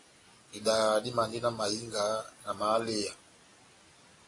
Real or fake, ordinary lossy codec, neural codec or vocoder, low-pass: real; AAC, 32 kbps; none; 10.8 kHz